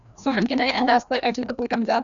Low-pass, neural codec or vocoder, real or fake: 7.2 kHz; codec, 16 kHz, 1 kbps, FreqCodec, larger model; fake